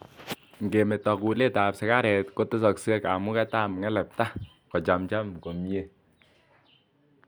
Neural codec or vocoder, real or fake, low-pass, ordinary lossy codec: none; real; none; none